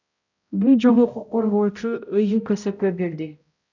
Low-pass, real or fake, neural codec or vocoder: 7.2 kHz; fake; codec, 16 kHz, 0.5 kbps, X-Codec, HuBERT features, trained on balanced general audio